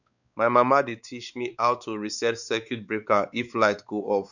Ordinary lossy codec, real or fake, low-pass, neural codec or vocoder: none; fake; 7.2 kHz; codec, 16 kHz, 4 kbps, X-Codec, WavLM features, trained on Multilingual LibriSpeech